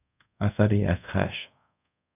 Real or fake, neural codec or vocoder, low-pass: fake; codec, 24 kHz, 0.5 kbps, DualCodec; 3.6 kHz